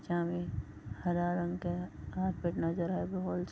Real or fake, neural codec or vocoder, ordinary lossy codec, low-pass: real; none; none; none